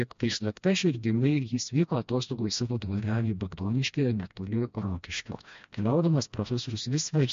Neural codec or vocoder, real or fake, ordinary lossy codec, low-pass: codec, 16 kHz, 1 kbps, FreqCodec, smaller model; fake; MP3, 48 kbps; 7.2 kHz